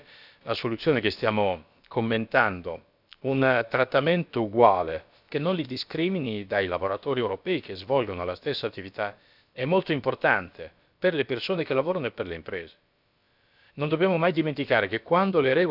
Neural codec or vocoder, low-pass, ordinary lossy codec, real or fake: codec, 16 kHz, about 1 kbps, DyCAST, with the encoder's durations; 5.4 kHz; none; fake